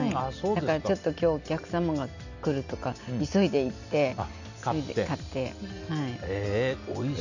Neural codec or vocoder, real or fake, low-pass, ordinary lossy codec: none; real; 7.2 kHz; none